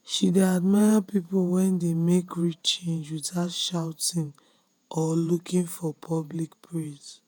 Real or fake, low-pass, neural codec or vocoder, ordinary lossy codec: fake; none; vocoder, 48 kHz, 128 mel bands, Vocos; none